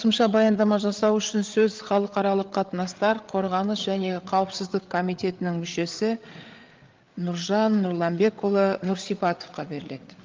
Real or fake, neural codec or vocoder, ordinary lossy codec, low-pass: fake; codec, 16 kHz, 8 kbps, FreqCodec, larger model; Opus, 32 kbps; 7.2 kHz